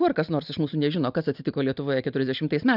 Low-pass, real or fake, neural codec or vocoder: 5.4 kHz; real; none